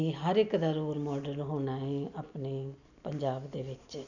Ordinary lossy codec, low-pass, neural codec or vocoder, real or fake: none; 7.2 kHz; none; real